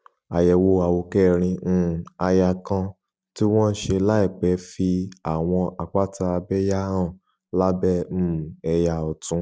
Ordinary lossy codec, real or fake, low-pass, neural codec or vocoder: none; real; none; none